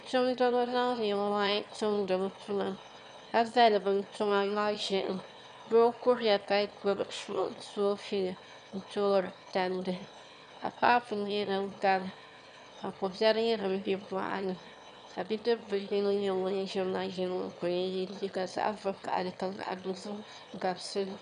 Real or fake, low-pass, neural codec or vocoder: fake; 9.9 kHz; autoencoder, 22.05 kHz, a latent of 192 numbers a frame, VITS, trained on one speaker